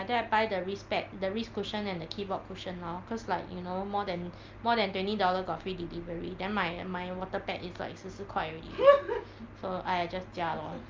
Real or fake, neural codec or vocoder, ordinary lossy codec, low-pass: real; none; Opus, 24 kbps; 7.2 kHz